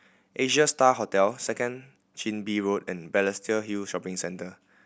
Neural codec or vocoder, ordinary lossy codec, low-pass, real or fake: none; none; none; real